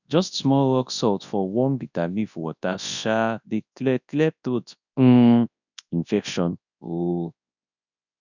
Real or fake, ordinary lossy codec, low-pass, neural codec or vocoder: fake; none; 7.2 kHz; codec, 24 kHz, 0.9 kbps, WavTokenizer, large speech release